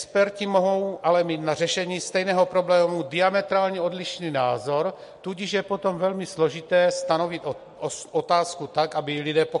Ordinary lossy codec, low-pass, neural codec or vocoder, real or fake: MP3, 48 kbps; 14.4 kHz; none; real